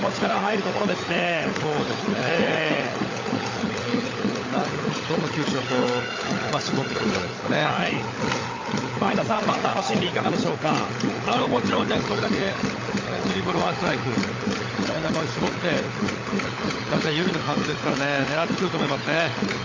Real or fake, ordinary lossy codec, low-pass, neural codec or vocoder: fake; AAC, 32 kbps; 7.2 kHz; codec, 16 kHz, 16 kbps, FunCodec, trained on LibriTTS, 50 frames a second